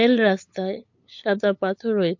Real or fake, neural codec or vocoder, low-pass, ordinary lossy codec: fake; codec, 16 kHz, 8 kbps, FunCodec, trained on LibriTTS, 25 frames a second; 7.2 kHz; MP3, 48 kbps